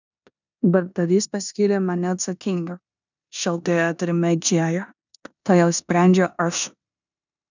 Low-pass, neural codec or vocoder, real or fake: 7.2 kHz; codec, 16 kHz in and 24 kHz out, 0.9 kbps, LongCat-Audio-Codec, four codebook decoder; fake